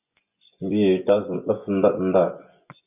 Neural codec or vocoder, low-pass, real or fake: vocoder, 24 kHz, 100 mel bands, Vocos; 3.6 kHz; fake